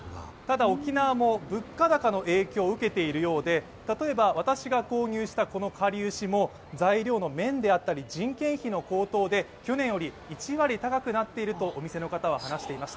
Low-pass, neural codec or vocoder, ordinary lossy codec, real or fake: none; none; none; real